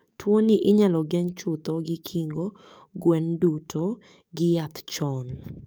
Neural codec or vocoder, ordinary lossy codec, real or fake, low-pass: codec, 44.1 kHz, 7.8 kbps, DAC; none; fake; none